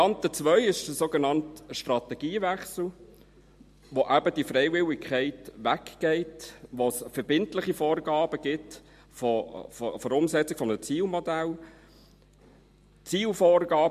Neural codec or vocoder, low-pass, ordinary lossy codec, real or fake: none; 14.4 kHz; MP3, 64 kbps; real